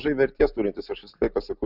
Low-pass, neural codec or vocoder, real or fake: 5.4 kHz; none; real